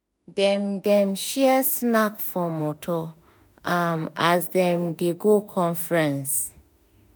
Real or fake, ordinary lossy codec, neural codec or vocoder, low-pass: fake; none; autoencoder, 48 kHz, 32 numbers a frame, DAC-VAE, trained on Japanese speech; none